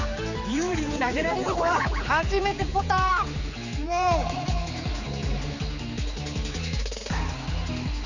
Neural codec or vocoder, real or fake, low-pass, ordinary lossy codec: codec, 16 kHz, 4 kbps, X-Codec, HuBERT features, trained on general audio; fake; 7.2 kHz; none